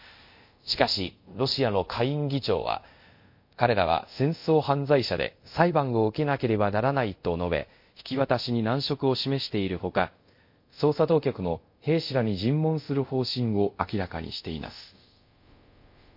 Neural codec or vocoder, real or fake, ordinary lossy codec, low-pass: codec, 24 kHz, 0.5 kbps, DualCodec; fake; MP3, 32 kbps; 5.4 kHz